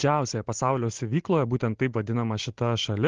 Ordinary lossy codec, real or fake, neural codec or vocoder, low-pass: Opus, 16 kbps; real; none; 7.2 kHz